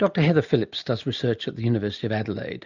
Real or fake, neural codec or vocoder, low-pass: real; none; 7.2 kHz